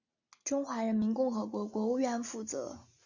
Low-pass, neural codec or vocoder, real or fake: 7.2 kHz; none; real